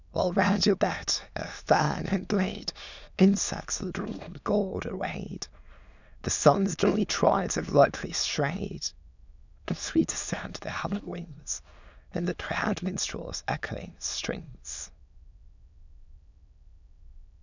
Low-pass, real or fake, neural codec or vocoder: 7.2 kHz; fake; autoencoder, 22.05 kHz, a latent of 192 numbers a frame, VITS, trained on many speakers